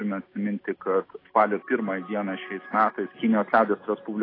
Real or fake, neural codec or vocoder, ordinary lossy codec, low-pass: real; none; AAC, 24 kbps; 5.4 kHz